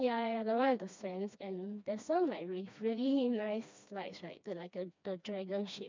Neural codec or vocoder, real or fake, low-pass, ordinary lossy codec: codec, 16 kHz, 2 kbps, FreqCodec, smaller model; fake; 7.2 kHz; MP3, 64 kbps